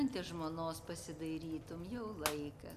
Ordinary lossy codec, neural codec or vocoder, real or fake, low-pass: MP3, 96 kbps; none; real; 14.4 kHz